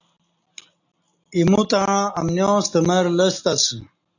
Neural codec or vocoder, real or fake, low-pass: none; real; 7.2 kHz